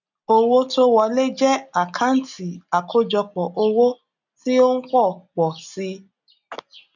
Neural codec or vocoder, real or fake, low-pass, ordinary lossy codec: none; real; 7.2 kHz; none